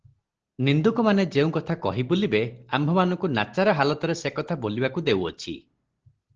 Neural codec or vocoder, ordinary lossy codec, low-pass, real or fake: none; Opus, 16 kbps; 7.2 kHz; real